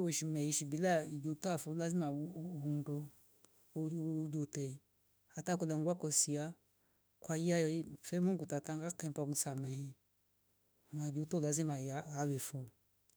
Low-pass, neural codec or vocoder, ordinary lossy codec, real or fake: none; autoencoder, 48 kHz, 32 numbers a frame, DAC-VAE, trained on Japanese speech; none; fake